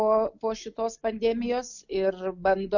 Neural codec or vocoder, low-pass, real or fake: none; 7.2 kHz; real